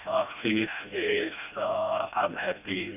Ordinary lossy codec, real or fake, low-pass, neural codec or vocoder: none; fake; 3.6 kHz; codec, 16 kHz, 1 kbps, FreqCodec, smaller model